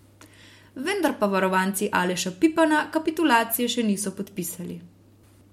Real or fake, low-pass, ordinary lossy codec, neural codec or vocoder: real; 19.8 kHz; MP3, 64 kbps; none